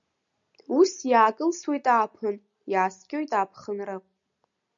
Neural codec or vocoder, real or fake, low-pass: none; real; 7.2 kHz